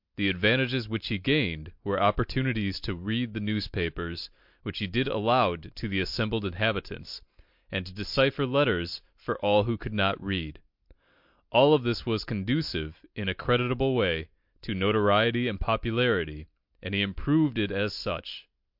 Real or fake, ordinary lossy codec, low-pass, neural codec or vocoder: real; MP3, 48 kbps; 5.4 kHz; none